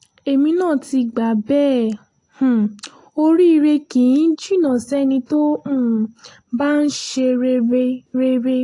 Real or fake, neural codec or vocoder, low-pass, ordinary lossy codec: real; none; 10.8 kHz; AAC, 48 kbps